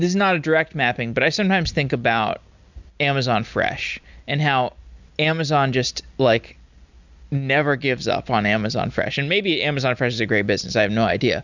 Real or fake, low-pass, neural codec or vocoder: real; 7.2 kHz; none